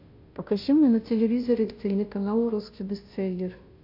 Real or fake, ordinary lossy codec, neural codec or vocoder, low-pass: fake; AAC, 48 kbps; codec, 16 kHz, 0.5 kbps, FunCodec, trained on Chinese and English, 25 frames a second; 5.4 kHz